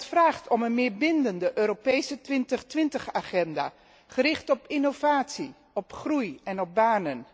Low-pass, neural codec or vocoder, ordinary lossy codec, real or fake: none; none; none; real